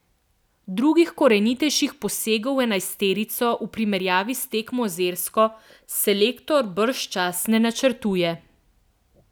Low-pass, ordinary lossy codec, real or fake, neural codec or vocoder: none; none; real; none